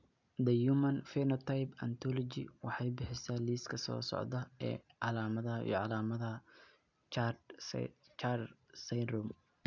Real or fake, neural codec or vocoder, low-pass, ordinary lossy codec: real; none; 7.2 kHz; none